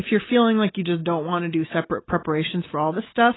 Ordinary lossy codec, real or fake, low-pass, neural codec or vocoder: AAC, 16 kbps; real; 7.2 kHz; none